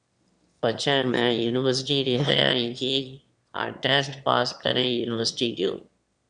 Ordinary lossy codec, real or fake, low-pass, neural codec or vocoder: Opus, 64 kbps; fake; 9.9 kHz; autoencoder, 22.05 kHz, a latent of 192 numbers a frame, VITS, trained on one speaker